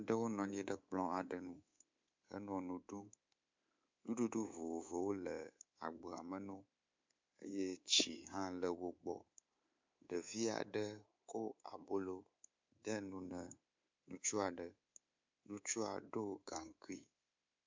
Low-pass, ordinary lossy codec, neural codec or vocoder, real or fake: 7.2 kHz; MP3, 64 kbps; codec, 24 kHz, 3.1 kbps, DualCodec; fake